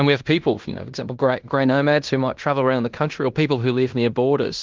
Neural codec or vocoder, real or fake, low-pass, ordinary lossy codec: codec, 16 kHz in and 24 kHz out, 0.9 kbps, LongCat-Audio-Codec, fine tuned four codebook decoder; fake; 7.2 kHz; Opus, 32 kbps